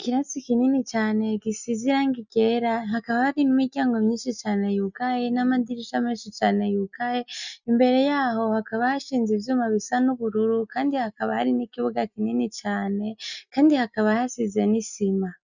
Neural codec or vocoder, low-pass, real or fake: none; 7.2 kHz; real